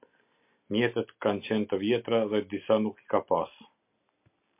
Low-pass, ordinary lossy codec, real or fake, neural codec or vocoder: 3.6 kHz; MP3, 32 kbps; real; none